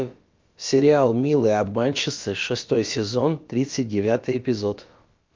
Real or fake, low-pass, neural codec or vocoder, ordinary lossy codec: fake; 7.2 kHz; codec, 16 kHz, about 1 kbps, DyCAST, with the encoder's durations; Opus, 32 kbps